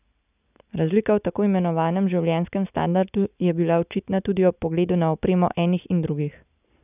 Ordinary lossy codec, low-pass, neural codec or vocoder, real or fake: none; 3.6 kHz; none; real